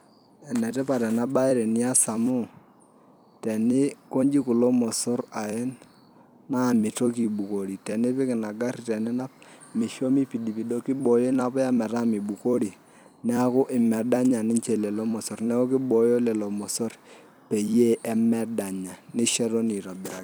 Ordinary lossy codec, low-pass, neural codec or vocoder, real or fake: none; none; vocoder, 44.1 kHz, 128 mel bands every 256 samples, BigVGAN v2; fake